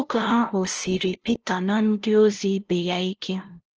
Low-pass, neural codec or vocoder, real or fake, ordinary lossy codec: 7.2 kHz; codec, 16 kHz, 0.5 kbps, FunCodec, trained on LibriTTS, 25 frames a second; fake; Opus, 16 kbps